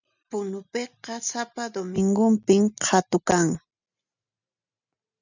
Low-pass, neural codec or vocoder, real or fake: 7.2 kHz; none; real